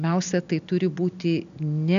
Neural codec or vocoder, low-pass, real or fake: none; 7.2 kHz; real